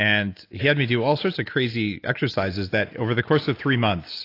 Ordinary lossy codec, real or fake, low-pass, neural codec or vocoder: AAC, 32 kbps; real; 5.4 kHz; none